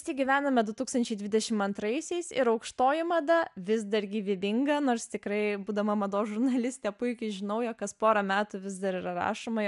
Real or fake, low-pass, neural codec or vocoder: real; 10.8 kHz; none